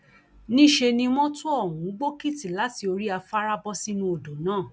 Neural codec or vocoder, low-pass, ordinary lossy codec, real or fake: none; none; none; real